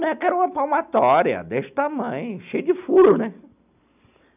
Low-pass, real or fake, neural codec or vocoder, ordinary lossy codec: 3.6 kHz; real; none; none